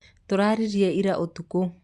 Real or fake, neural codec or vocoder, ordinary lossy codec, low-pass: real; none; Opus, 64 kbps; 10.8 kHz